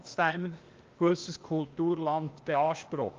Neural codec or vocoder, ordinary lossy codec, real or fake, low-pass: codec, 16 kHz, 0.8 kbps, ZipCodec; Opus, 32 kbps; fake; 7.2 kHz